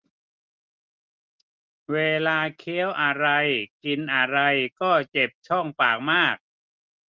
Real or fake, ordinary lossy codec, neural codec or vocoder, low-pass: real; Opus, 32 kbps; none; 7.2 kHz